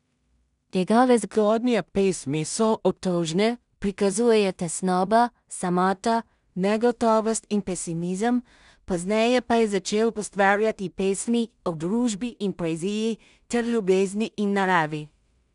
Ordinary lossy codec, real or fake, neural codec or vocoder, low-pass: none; fake; codec, 16 kHz in and 24 kHz out, 0.4 kbps, LongCat-Audio-Codec, two codebook decoder; 10.8 kHz